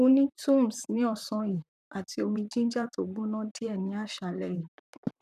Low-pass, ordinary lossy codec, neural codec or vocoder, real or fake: 14.4 kHz; none; vocoder, 44.1 kHz, 128 mel bands, Pupu-Vocoder; fake